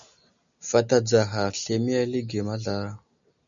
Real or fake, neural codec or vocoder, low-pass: real; none; 7.2 kHz